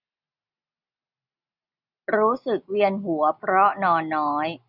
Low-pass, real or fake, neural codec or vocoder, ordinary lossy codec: 5.4 kHz; real; none; none